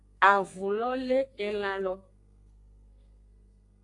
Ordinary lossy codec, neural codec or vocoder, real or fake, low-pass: AAC, 48 kbps; codec, 32 kHz, 1.9 kbps, SNAC; fake; 10.8 kHz